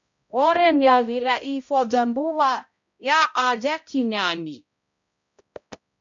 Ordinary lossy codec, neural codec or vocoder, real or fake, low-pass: MP3, 64 kbps; codec, 16 kHz, 0.5 kbps, X-Codec, HuBERT features, trained on balanced general audio; fake; 7.2 kHz